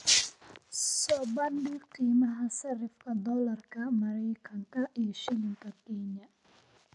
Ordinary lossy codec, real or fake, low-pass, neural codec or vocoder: none; real; 10.8 kHz; none